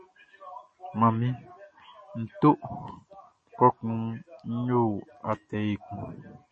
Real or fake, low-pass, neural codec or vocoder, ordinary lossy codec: real; 10.8 kHz; none; MP3, 32 kbps